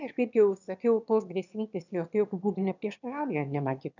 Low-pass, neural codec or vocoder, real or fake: 7.2 kHz; autoencoder, 22.05 kHz, a latent of 192 numbers a frame, VITS, trained on one speaker; fake